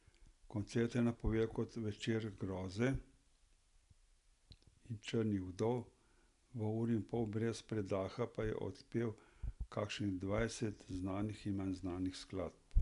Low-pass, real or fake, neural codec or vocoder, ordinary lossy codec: 10.8 kHz; real; none; none